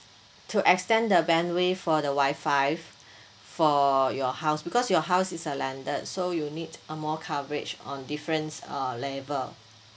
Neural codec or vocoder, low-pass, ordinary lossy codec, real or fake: none; none; none; real